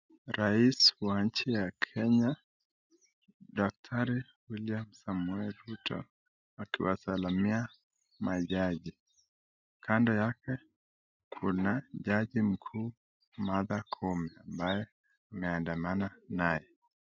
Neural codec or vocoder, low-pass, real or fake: none; 7.2 kHz; real